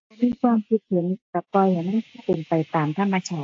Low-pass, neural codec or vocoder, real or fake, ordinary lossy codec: 7.2 kHz; none; real; AAC, 48 kbps